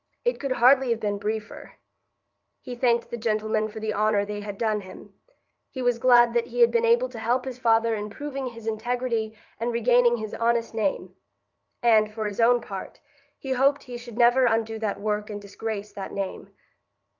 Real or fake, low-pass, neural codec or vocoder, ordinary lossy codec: fake; 7.2 kHz; vocoder, 44.1 kHz, 80 mel bands, Vocos; Opus, 24 kbps